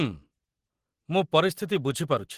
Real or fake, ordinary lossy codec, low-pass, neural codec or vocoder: real; Opus, 16 kbps; 14.4 kHz; none